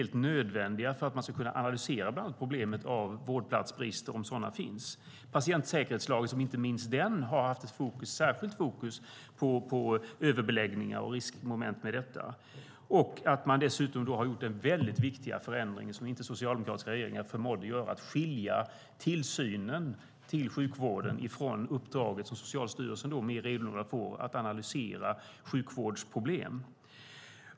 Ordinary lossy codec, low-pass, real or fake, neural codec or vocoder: none; none; real; none